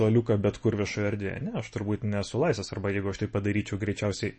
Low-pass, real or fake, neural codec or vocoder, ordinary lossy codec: 10.8 kHz; real; none; MP3, 32 kbps